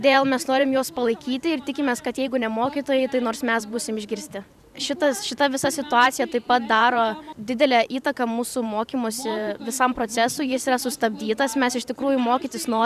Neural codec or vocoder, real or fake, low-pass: vocoder, 44.1 kHz, 128 mel bands every 512 samples, BigVGAN v2; fake; 14.4 kHz